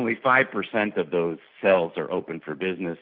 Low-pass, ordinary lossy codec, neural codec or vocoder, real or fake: 5.4 kHz; Opus, 64 kbps; none; real